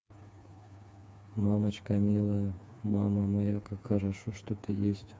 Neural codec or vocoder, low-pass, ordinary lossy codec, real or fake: codec, 16 kHz, 4 kbps, FreqCodec, smaller model; none; none; fake